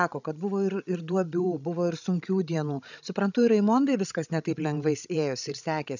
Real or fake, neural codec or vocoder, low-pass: fake; codec, 16 kHz, 16 kbps, FreqCodec, larger model; 7.2 kHz